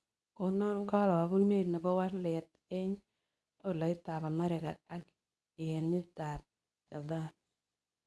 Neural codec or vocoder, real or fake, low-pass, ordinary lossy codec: codec, 24 kHz, 0.9 kbps, WavTokenizer, medium speech release version 2; fake; none; none